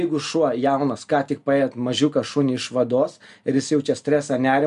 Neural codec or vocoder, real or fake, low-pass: none; real; 10.8 kHz